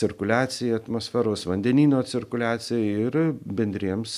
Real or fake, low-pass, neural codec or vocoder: real; 14.4 kHz; none